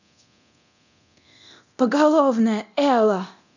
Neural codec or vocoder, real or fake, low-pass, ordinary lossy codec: codec, 24 kHz, 0.9 kbps, DualCodec; fake; 7.2 kHz; none